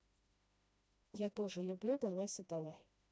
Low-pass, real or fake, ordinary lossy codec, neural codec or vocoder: none; fake; none; codec, 16 kHz, 1 kbps, FreqCodec, smaller model